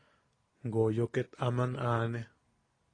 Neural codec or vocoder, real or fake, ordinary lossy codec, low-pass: none; real; AAC, 32 kbps; 9.9 kHz